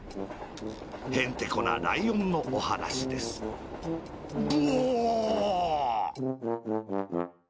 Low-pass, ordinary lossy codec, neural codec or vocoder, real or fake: none; none; none; real